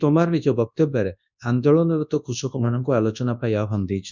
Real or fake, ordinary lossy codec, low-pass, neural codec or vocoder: fake; none; 7.2 kHz; codec, 24 kHz, 0.9 kbps, WavTokenizer, large speech release